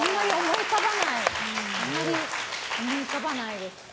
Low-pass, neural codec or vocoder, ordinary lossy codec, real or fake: none; none; none; real